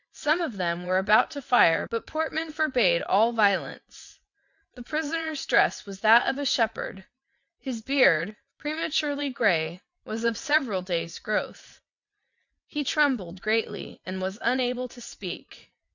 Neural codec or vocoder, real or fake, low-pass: vocoder, 22.05 kHz, 80 mel bands, WaveNeXt; fake; 7.2 kHz